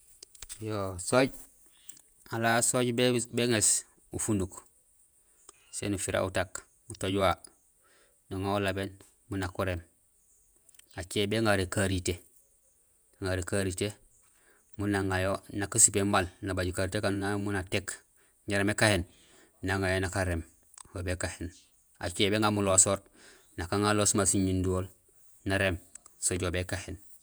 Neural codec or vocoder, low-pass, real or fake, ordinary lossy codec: vocoder, 48 kHz, 128 mel bands, Vocos; none; fake; none